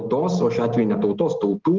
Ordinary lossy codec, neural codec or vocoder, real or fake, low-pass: Opus, 16 kbps; none; real; 7.2 kHz